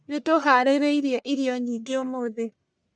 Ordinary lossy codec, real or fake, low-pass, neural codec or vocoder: none; fake; 9.9 kHz; codec, 44.1 kHz, 1.7 kbps, Pupu-Codec